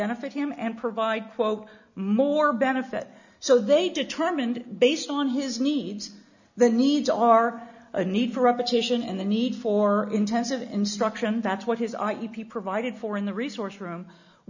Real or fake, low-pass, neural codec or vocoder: real; 7.2 kHz; none